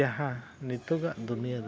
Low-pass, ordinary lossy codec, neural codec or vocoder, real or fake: none; none; none; real